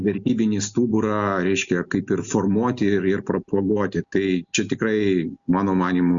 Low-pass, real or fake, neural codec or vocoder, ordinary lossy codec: 7.2 kHz; real; none; Opus, 64 kbps